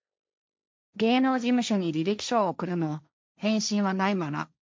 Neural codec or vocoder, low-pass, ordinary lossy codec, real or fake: codec, 16 kHz, 1.1 kbps, Voila-Tokenizer; none; none; fake